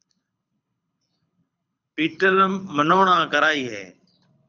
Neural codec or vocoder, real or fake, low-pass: codec, 24 kHz, 6 kbps, HILCodec; fake; 7.2 kHz